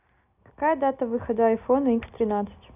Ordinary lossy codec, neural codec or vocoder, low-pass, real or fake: none; none; 3.6 kHz; real